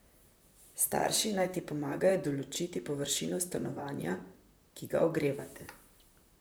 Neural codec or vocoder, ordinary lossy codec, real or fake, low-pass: vocoder, 44.1 kHz, 128 mel bands, Pupu-Vocoder; none; fake; none